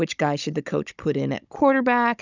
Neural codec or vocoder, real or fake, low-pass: codec, 16 kHz, 16 kbps, FunCodec, trained on Chinese and English, 50 frames a second; fake; 7.2 kHz